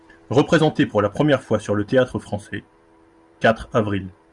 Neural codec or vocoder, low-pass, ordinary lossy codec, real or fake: vocoder, 44.1 kHz, 128 mel bands every 512 samples, BigVGAN v2; 10.8 kHz; Opus, 64 kbps; fake